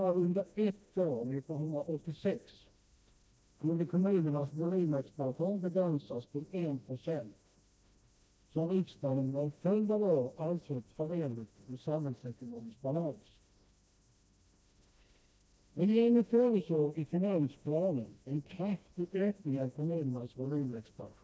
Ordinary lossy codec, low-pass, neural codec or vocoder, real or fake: none; none; codec, 16 kHz, 1 kbps, FreqCodec, smaller model; fake